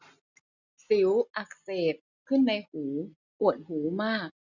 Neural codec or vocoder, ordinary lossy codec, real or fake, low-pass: none; none; real; 7.2 kHz